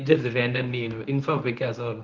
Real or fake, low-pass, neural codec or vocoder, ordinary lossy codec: fake; 7.2 kHz; codec, 24 kHz, 0.9 kbps, WavTokenizer, medium speech release version 1; Opus, 24 kbps